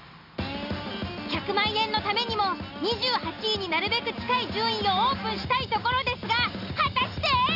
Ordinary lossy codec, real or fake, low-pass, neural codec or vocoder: none; real; 5.4 kHz; none